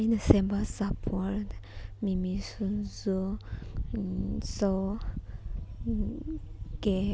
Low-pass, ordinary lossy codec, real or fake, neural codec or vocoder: none; none; real; none